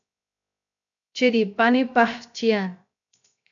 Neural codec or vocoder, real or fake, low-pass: codec, 16 kHz, 0.7 kbps, FocalCodec; fake; 7.2 kHz